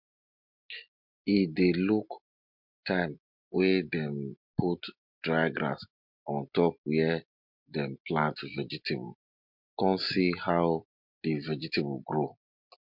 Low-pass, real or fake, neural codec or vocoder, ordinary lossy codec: 5.4 kHz; real; none; MP3, 48 kbps